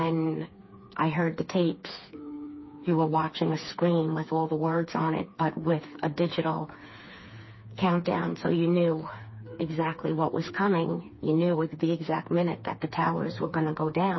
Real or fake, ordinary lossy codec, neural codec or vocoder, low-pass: fake; MP3, 24 kbps; codec, 16 kHz, 4 kbps, FreqCodec, smaller model; 7.2 kHz